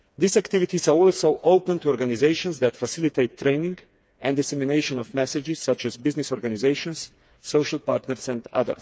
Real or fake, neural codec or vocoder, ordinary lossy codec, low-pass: fake; codec, 16 kHz, 4 kbps, FreqCodec, smaller model; none; none